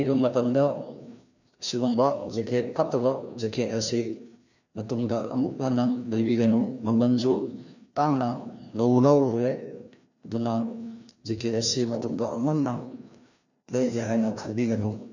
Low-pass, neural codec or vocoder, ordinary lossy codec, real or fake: 7.2 kHz; codec, 16 kHz, 1 kbps, FreqCodec, larger model; none; fake